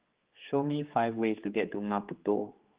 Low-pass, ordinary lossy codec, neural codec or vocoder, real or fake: 3.6 kHz; Opus, 16 kbps; codec, 16 kHz, 4 kbps, X-Codec, HuBERT features, trained on general audio; fake